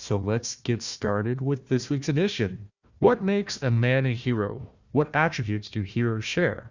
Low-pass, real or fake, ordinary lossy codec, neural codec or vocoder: 7.2 kHz; fake; Opus, 64 kbps; codec, 16 kHz, 1 kbps, FunCodec, trained on Chinese and English, 50 frames a second